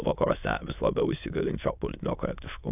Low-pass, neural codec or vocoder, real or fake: 3.6 kHz; autoencoder, 22.05 kHz, a latent of 192 numbers a frame, VITS, trained on many speakers; fake